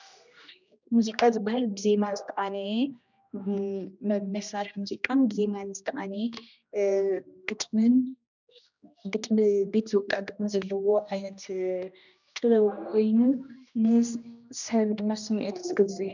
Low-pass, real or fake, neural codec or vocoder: 7.2 kHz; fake; codec, 16 kHz, 1 kbps, X-Codec, HuBERT features, trained on general audio